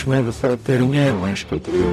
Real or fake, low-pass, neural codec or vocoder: fake; 14.4 kHz; codec, 44.1 kHz, 0.9 kbps, DAC